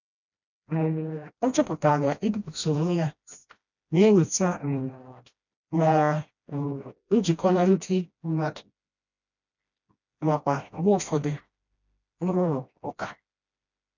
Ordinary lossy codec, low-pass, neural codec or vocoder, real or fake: none; 7.2 kHz; codec, 16 kHz, 1 kbps, FreqCodec, smaller model; fake